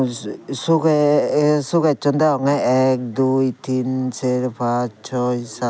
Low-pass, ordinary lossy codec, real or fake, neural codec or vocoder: none; none; real; none